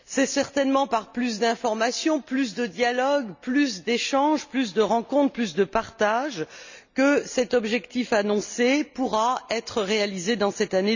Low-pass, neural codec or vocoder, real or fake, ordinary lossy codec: 7.2 kHz; none; real; none